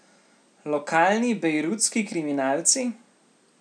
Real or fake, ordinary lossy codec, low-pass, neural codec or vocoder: real; none; 9.9 kHz; none